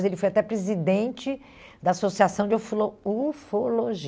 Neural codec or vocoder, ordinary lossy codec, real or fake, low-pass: none; none; real; none